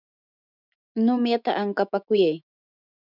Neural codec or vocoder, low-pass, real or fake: autoencoder, 48 kHz, 128 numbers a frame, DAC-VAE, trained on Japanese speech; 5.4 kHz; fake